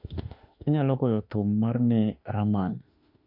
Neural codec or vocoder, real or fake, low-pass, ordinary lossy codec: autoencoder, 48 kHz, 32 numbers a frame, DAC-VAE, trained on Japanese speech; fake; 5.4 kHz; none